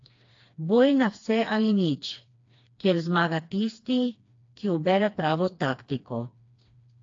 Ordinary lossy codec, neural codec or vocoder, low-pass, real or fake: AAC, 48 kbps; codec, 16 kHz, 2 kbps, FreqCodec, smaller model; 7.2 kHz; fake